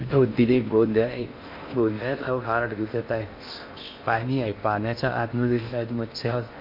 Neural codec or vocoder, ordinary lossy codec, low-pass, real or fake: codec, 16 kHz in and 24 kHz out, 0.6 kbps, FocalCodec, streaming, 4096 codes; MP3, 32 kbps; 5.4 kHz; fake